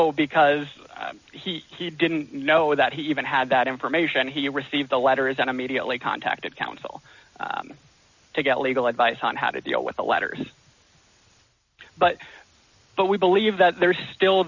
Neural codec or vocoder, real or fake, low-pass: none; real; 7.2 kHz